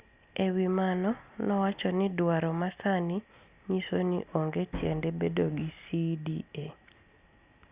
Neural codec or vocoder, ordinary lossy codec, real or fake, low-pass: none; Opus, 64 kbps; real; 3.6 kHz